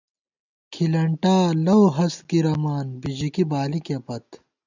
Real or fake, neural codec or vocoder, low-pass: real; none; 7.2 kHz